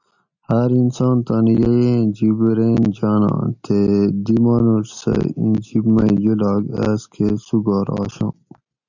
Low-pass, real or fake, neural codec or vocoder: 7.2 kHz; real; none